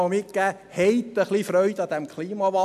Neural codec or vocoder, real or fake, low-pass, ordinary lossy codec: none; real; 14.4 kHz; none